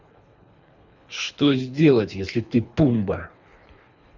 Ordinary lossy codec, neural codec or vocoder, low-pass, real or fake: none; codec, 24 kHz, 3 kbps, HILCodec; 7.2 kHz; fake